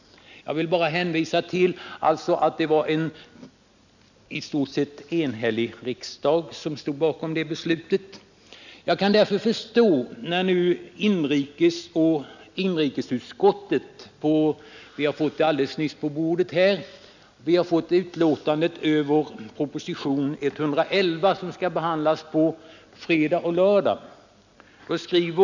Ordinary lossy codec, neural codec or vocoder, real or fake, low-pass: none; none; real; 7.2 kHz